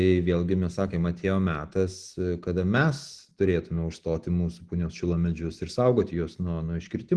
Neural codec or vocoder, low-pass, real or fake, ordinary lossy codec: none; 10.8 kHz; real; Opus, 16 kbps